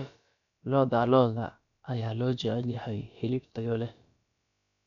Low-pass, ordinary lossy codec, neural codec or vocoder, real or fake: 7.2 kHz; none; codec, 16 kHz, about 1 kbps, DyCAST, with the encoder's durations; fake